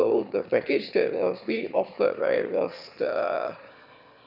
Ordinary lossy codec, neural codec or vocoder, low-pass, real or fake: none; autoencoder, 22.05 kHz, a latent of 192 numbers a frame, VITS, trained on one speaker; 5.4 kHz; fake